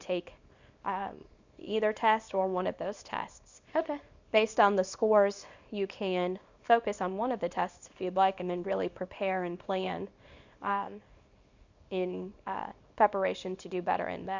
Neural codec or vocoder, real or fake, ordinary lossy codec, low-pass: codec, 24 kHz, 0.9 kbps, WavTokenizer, small release; fake; Opus, 64 kbps; 7.2 kHz